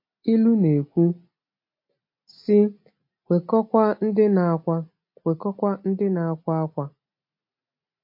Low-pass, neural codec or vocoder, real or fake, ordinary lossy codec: 5.4 kHz; none; real; MP3, 32 kbps